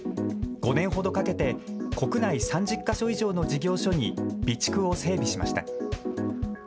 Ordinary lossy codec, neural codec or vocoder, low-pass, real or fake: none; none; none; real